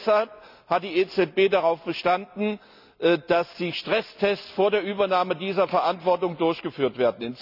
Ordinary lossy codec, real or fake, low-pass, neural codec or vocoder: none; real; 5.4 kHz; none